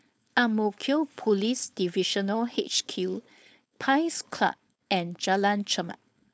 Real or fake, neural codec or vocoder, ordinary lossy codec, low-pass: fake; codec, 16 kHz, 4.8 kbps, FACodec; none; none